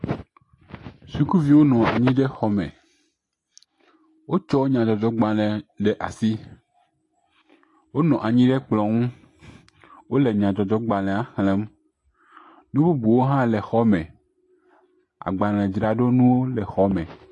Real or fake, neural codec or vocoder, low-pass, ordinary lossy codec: real; none; 10.8 kHz; AAC, 48 kbps